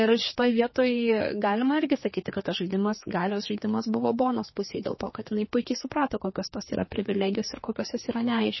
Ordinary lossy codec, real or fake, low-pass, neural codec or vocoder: MP3, 24 kbps; fake; 7.2 kHz; codec, 16 kHz, 4 kbps, X-Codec, HuBERT features, trained on general audio